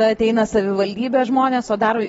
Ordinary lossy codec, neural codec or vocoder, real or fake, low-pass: AAC, 24 kbps; none; real; 19.8 kHz